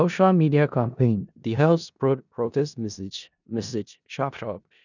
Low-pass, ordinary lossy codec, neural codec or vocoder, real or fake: 7.2 kHz; none; codec, 16 kHz in and 24 kHz out, 0.4 kbps, LongCat-Audio-Codec, four codebook decoder; fake